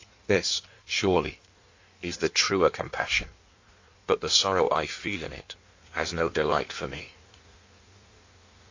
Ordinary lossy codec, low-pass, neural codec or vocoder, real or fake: AAC, 48 kbps; 7.2 kHz; codec, 16 kHz in and 24 kHz out, 1.1 kbps, FireRedTTS-2 codec; fake